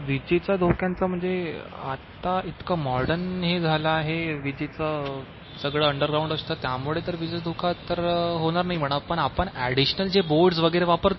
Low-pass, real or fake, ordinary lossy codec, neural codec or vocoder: 7.2 kHz; real; MP3, 24 kbps; none